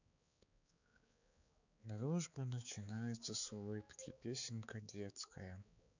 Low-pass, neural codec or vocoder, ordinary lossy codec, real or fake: 7.2 kHz; codec, 16 kHz, 4 kbps, X-Codec, HuBERT features, trained on balanced general audio; none; fake